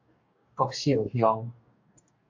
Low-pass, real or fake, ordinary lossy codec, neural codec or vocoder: 7.2 kHz; fake; AAC, 48 kbps; codec, 44.1 kHz, 2.6 kbps, DAC